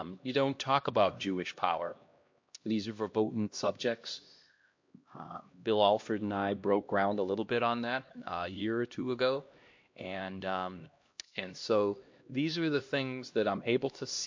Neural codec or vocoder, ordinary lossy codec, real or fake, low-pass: codec, 16 kHz, 1 kbps, X-Codec, HuBERT features, trained on LibriSpeech; MP3, 48 kbps; fake; 7.2 kHz